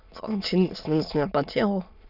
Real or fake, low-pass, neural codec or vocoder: fake; 5.4 kHz; autoencoder, 22.05 kHz, a latent of 192 numbers a frame, VITS, trained on many speakers